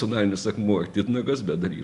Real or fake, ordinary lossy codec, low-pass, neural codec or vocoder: real; Opus, 64 kbps; 10.8 kHz; none